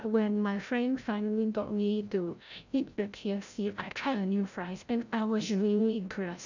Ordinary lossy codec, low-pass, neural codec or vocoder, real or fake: none; 7.2 kHz; codec, 16 kHz, 0.5 kbps, FreqCodec, larger model; fake